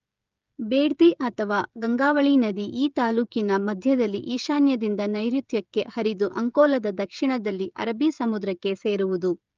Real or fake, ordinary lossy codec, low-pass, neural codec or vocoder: fake; Opus, 24 kbps; 7.2 kHz; codec, 16 kHz, 16 kbps, FreqCodec, smaller model